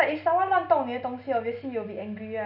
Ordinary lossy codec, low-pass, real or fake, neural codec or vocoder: none; 5.4 kHz; real; none